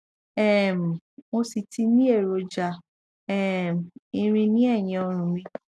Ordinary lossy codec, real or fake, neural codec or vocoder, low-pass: none; real; none; none